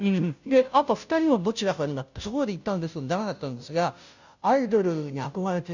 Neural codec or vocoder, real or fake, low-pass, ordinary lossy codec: codec, 16 kHz, 0.5 kbps, FunCodec, trained on Chinese and English, 25 frames a second; fake; 7.2 kHz; none